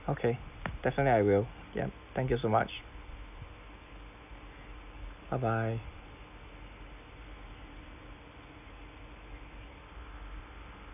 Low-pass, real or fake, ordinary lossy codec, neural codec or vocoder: 3.6 kHz; real; none; none